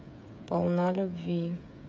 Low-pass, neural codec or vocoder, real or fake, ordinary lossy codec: none; codec, 16 kHz, 8 kbps, FreqCodec, smaller model; fake; none